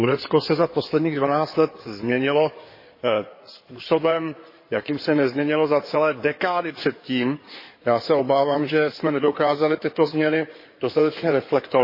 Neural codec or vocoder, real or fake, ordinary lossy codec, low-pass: codec, 16 kHz in and 24 kHz out, 2.2 kbps, FireRedTTS-2 codec; fake; MP3, 24 kbps; 5.4 kHz